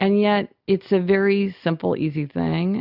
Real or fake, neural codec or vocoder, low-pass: real; none; 5.4 kHz